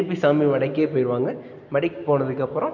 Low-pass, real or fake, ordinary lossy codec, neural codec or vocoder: 7.2 kHz; real; none; none